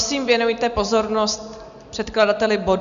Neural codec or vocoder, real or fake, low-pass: none; real; 7.2 kHz